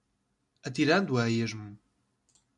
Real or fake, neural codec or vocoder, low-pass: real; none; 10.8 kHz